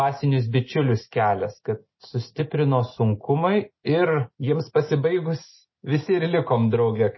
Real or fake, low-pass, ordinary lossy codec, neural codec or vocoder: real; 7.2 kHz; MP3, 24 kbps; none